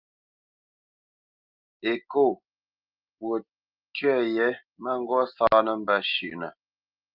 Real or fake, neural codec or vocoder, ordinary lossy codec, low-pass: real; none; Opus, 24 kbps; 5.4 kHz